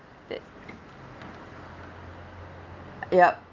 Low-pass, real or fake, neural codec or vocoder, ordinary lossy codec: 7.2 kHz; real; none; Opus, 32 kbps